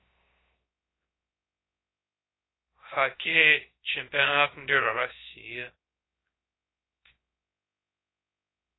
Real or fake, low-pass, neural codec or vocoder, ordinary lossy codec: fake; 7.2 kHz; codec, 16 kHz, 0.3 kbps, FocalCodec; AAC, 16 kbps